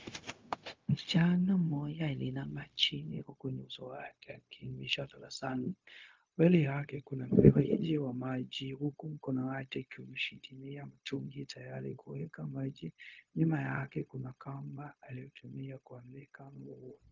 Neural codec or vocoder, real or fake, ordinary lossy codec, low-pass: codec, 16 kHz, 0.4 kbps, LongCat-Audio-Codec; fake; Opus, 24 kbps; 7.2 kHz